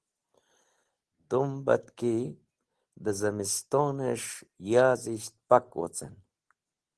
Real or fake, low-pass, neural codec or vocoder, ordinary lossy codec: real; 10.8 kHz; none; Opus, 16 kbps